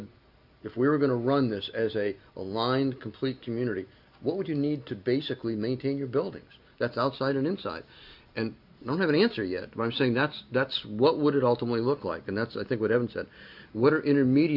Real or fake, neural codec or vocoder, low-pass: real; none; 5.4 kHz